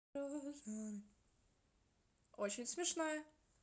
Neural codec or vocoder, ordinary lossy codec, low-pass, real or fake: none; none; none; real